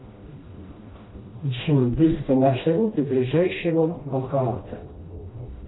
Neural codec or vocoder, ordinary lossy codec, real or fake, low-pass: codec, 16 kHz, 1 kbps, FreqCodec, smaller model; AAC, 16 kbps; fake; 7.2 kHz